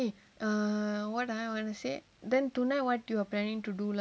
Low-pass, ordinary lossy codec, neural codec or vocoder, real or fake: none; none; none; real